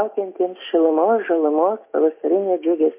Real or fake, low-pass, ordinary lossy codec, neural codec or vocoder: real; 3.6 kHz; MP3, 24 kbps; none